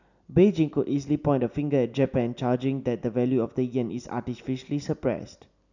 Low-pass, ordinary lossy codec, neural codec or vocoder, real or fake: 7.2 kHz; none; none; real